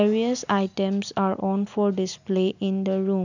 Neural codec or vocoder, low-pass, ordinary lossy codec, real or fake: none; 7.2 kHz; none; real